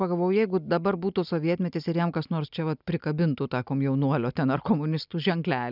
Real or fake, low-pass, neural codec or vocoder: real; 5.4 kHz; none